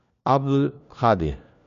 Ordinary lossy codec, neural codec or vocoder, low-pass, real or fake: none; codec, 16 kHz, 1 kbps, FunCodec, trained on LibriTTS, 50 frames a second; 7.2 kHz; fake